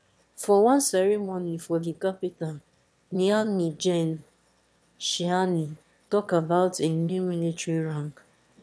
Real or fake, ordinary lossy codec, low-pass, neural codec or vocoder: fake; none; none; autoencoder, 22.05 kHz, a latent of 192 numbers a frame, VITS, trained on one speaker